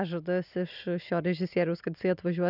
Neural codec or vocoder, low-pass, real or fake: none; 5.4 kHz; real